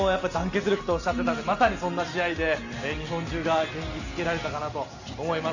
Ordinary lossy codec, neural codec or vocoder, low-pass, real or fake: none; none; 7.2 kHz; real